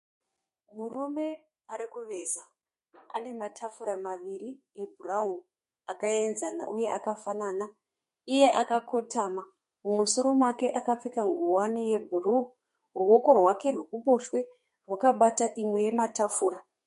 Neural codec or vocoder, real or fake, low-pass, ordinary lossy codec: codec, 32 kHz, 1.9 kbps, SNAC; fake; 14.4 kHz; MP3, 48 kbps